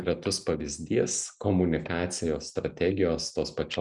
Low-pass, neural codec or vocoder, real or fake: 10.8 kHz; none; real